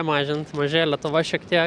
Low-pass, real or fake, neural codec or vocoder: 9.9 kHz; real; none